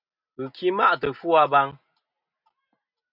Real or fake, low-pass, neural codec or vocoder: real; 5.4 kHz; none